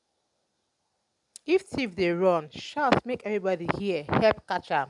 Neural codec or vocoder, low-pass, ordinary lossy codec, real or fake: none; 10.8 kHz; none; real